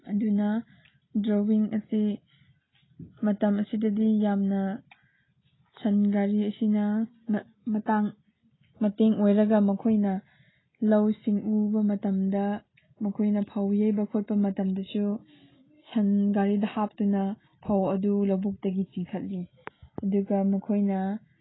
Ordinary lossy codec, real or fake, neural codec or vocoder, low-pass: AAC, 16 kbps; real; none; 7.2 kHz